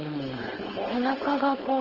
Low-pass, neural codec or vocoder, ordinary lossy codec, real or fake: 5.4 kHz; codec, 16 kHz, 4.8 kbps, FACodec; Opus, 16 kbps; fake